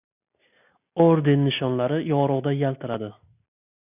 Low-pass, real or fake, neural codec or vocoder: 3.6 kHz; real; none